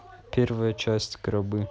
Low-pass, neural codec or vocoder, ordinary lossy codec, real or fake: none; none; none; real